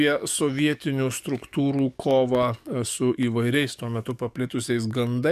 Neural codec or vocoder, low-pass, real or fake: codec, 44.1 kHz, 7.8 kbps, DAC; 14.4 kHz; fake